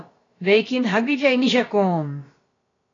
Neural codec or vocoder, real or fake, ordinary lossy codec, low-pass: codec, 16 kHz, about 1 kbps, DyCAST, with the encoder's durations; fake; AAC, 32 kbps; 7.2 kHz